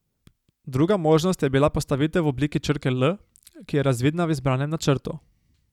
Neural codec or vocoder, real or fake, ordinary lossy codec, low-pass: none; real; none; 19.8 kHz